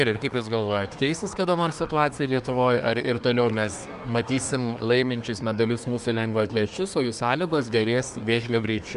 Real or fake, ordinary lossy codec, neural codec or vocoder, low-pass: fake; Opus, 64 kbps; codec, 24 kHz, 1 kbps, SNAC; 10.8 kHz